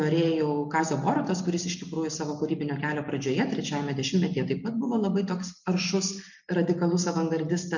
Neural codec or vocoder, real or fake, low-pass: none; real; 7.2 kHz